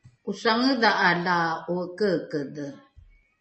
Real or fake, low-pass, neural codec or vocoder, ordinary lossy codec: real; 10.8 kHz; none; MP3, 32 kbps